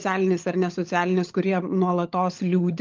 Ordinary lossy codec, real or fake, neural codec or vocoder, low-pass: Opus, 24 kbps; fake; codec, 16 kHz, 16 kbps, FunCodec, trained on LibriTTS, 50 frames a second; 7.2 kHz